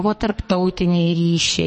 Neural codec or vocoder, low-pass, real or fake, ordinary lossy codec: codec, 44.1 kHz, 2.6 kbps, SNAC; 10.8 kHz; fake; MP3, 32 kbps